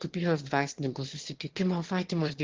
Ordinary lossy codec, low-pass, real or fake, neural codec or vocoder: Opus, 16 kbps; 7.2 kHz; fake; autoencoder, 22.05 kHz, a latent of 192 numbers a frame, VITS, trained on one speaker